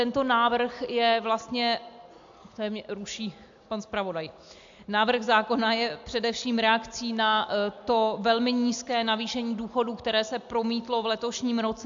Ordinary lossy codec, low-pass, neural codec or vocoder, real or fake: AAC, 64 kbps; 7.2 kHz; none; real